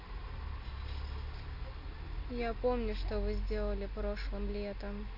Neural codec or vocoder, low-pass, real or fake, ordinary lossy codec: none; 5.4 kHz; real; none